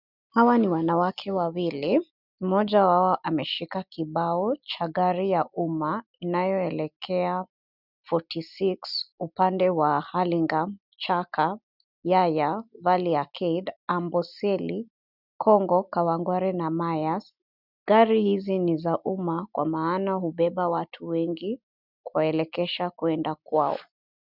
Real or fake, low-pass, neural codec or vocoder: real; 5.4 kHz; none